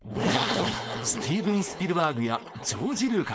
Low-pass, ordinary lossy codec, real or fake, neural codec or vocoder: none; none; fake; codec, 16 kHz, 4.8 kbps, FACodec